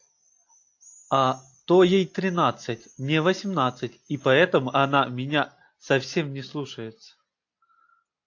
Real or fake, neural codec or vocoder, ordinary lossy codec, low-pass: real; none; AAC, 48 kbps; 7.2 kHz